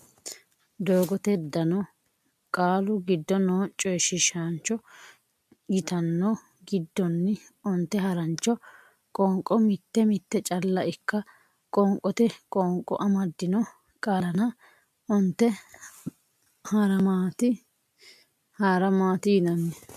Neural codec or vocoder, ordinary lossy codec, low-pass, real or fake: none; MP3, 96 kbps; 14.4 kHz; real